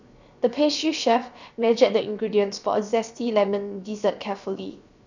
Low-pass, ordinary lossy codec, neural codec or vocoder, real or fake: 7.2 kHz; none; codec, 16 kHz, 0.7 kbps, FocalCodec; fake